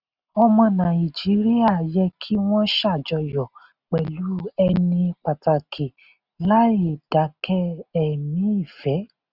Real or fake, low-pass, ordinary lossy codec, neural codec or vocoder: real; 5.4 kHz; none; none